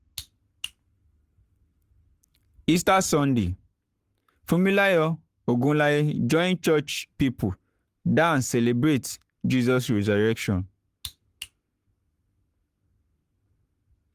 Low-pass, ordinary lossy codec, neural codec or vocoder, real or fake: 14.4 kHz; Opus, 24 kbps; codec, 44.1 kHz, 7.8 kbps, Pupu-Codec; fake